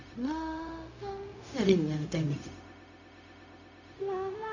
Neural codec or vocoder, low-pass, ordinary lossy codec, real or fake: codec, 16 kHz, 0.4 kbps, LongCat-Audio-Codec; 7.2 kHz; none; fake